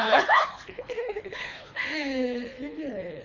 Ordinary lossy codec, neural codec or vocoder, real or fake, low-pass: AAC, 48 kbps; codec, 24 kHz, 3 kbps, HILCodec; fake; 7.2 kHz